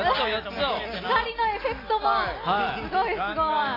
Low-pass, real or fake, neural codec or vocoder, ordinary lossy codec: 5.4 kHz; real; none; AAC, 24 kbps